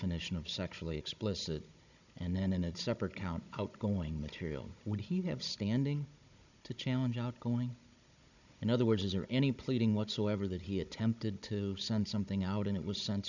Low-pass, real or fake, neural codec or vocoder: 7.2 kHz; fake; codec, 16 kHz, 16 kbps, FunCodec, trained on Chinese and English, 50 frames a second